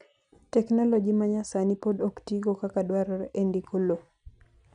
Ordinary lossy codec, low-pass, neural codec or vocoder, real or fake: none; 9.9 kHz; none; real